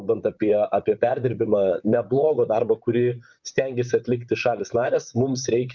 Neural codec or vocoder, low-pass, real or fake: vocoder, 44.1 kHz, 128 mel bands, Pupu-Vocoder; 7.2 kHz; fake